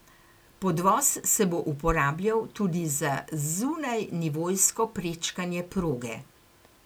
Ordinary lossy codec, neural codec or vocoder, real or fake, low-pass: none; none; real; none